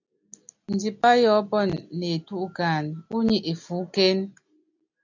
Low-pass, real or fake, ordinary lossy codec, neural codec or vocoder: 7.2 kHz; real; AAC, 48 kbps; none